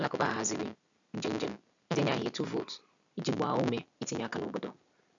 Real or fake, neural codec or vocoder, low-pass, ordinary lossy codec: real; none; 7.2 kHz; none